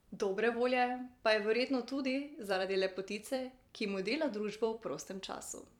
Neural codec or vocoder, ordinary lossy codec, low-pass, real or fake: vocoder, 44.1 kHz, 128 mel bands every 256 samples, BigVGAN v2; none; 19.8 kHz; fake